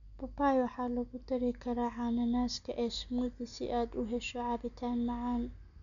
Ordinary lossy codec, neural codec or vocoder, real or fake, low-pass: none; none; real; 7.2 kHz